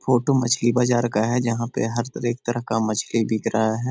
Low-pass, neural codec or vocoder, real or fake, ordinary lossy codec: none; none; real; none